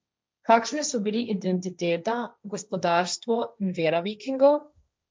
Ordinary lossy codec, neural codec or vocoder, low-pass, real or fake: none; codec, 16 kHz, 1.1 kbps, Voila-Tokenizer; none; fake